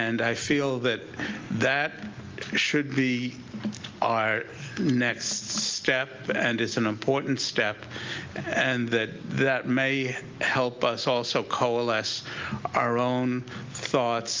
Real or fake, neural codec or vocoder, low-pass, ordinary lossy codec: real; none; 7.2 kHz; Opus, 24 kbps